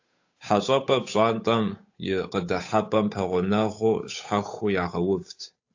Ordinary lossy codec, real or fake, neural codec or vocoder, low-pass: AAC, 48 kbps; fake; codec, 16 kHz, 8 kbps, FunCodec, trained on Chinese and English, 25 frames a second; 7.2 kHz